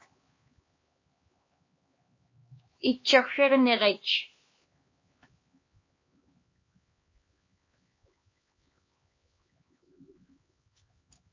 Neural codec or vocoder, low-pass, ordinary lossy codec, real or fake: codec, 16 kHz, 2 kbps, X-Codec, HuBERT features, trained on LibriSpeech; 7.2 kHz; MP3, 32 kbps; fake